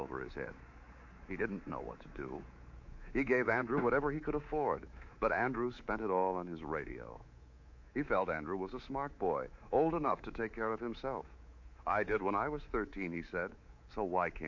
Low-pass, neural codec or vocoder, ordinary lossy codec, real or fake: 7.2 kHz; codec, 24 kHz, 3.1 kbps, DualCodec; MP3, 48 kbps; fake